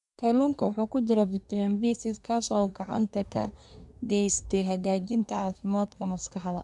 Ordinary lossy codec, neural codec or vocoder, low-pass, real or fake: none; codec, 24 kHz, 1 kbps, SNAC; 10.8 kHz; fake